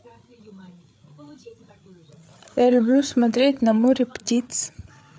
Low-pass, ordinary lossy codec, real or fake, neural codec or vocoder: none; none; fake; codec, 16 kHz, 8 kbps, FreqCodec, larger model